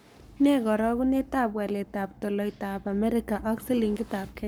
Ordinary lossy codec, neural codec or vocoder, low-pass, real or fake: none; codec, 44.1 kHz, 7.8 kbps, Pupu-Codec; none; fake